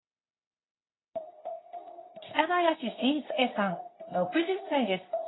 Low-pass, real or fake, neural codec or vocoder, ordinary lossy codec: 7.2 kHz; fake; codec, 24 kHz, 0.9 kbps, WavTokenizer, medium speech release version 2; AAC, 16 kbps